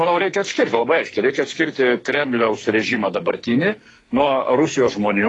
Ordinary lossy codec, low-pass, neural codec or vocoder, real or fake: AAC, 32 kbps; 10.8 kHz; codec, 44.1 kHz, 2.6 kbps, SNAC; fake